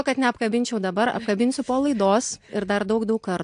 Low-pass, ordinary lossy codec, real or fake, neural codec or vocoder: 9.9 kHz; AAC, 64 kbps; real; none